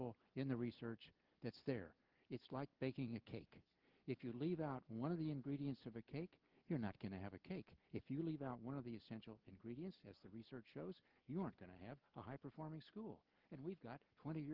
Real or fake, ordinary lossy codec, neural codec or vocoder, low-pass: real; Opus, 16 kbps; none; 5.4 kHz